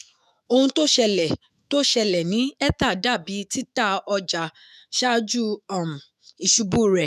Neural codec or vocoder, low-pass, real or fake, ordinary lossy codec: autoencoder, 48 kHz, 128 numbers a frame, DAC-VAE, trained on Japanese speech; 14.4 kHz; fake; none